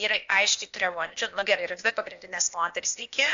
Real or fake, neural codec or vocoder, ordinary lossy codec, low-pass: fake; codec, 16 kHz, 0.8 kbps, ZipCodec; AAC, 64 kbps; 7.2 kHz